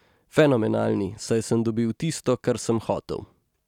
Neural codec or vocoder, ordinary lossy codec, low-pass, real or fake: none; none; 19.8 kHz; real